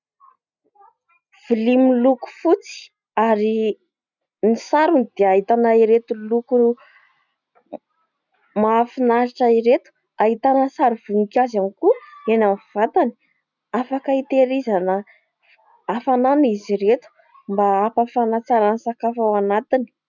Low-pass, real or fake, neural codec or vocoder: 7.2 kHz; real; none